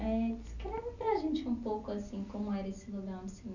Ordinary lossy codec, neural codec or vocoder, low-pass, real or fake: none; none; 7.2 kHz; real